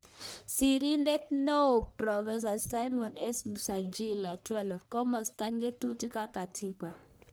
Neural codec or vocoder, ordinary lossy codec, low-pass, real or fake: codec, 44.1 kHz, 1.7 kbps, Pupu-Codec; none; none; fake